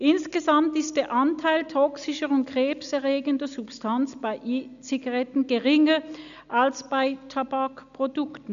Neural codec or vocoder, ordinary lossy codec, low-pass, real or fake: none; none; 7.2 kHz; real